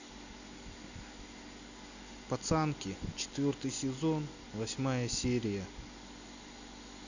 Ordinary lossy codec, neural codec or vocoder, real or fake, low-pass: none; none; real; 7.2 kHz